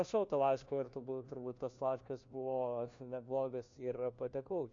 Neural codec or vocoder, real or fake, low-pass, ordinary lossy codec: codec, 16 kHz, 1 kbps, FunCodec, trained on LibriTTS, 50 frames a second; fake; 7.2 kHz; AAC, 64 kbps